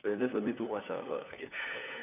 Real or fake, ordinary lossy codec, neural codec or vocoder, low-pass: fake; none; codec, 16 kHz, 4 kbps, FunCodec, trained on LibriTTS, 50 frames a second; 3.6 kHz